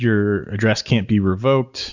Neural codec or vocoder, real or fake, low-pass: none; real; 7.2 kHz